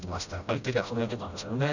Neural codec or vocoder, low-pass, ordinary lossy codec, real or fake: codec, 16 kHz, 0.5 kbps, FreqCodec, smaller model; 7.2 kHz; none; fake